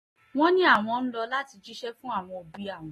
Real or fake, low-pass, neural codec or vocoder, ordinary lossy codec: real; 19.8 kHz; none; AAC, 32 kbps